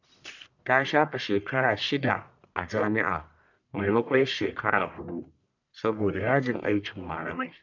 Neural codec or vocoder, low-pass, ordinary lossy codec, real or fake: codec, 44.1 kHz, 1.7 kbps, Pupu-Codec; 7.2 kHz; none; fake